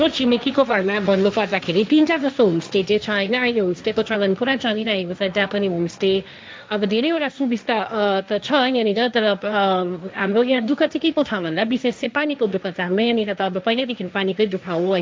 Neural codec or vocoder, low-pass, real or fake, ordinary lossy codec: codec, 16 kHz, 1.1 kbps, Voila-Tokenizer; none; fake; none